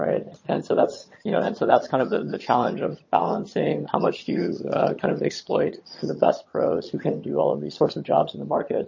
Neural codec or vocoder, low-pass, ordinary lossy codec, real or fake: vocoder, 22.05 kHz, 80 mel bands, HiFi-GAN; 7.2 kHz; MP3, 32 kbps; fake